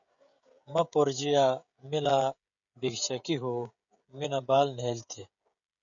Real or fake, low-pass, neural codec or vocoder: fake; 7.2 kHz; codec, 16 kHz, 16 kbps, FreqCodec, smaller model